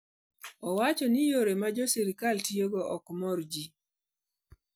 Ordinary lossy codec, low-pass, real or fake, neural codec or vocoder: none; none; real; none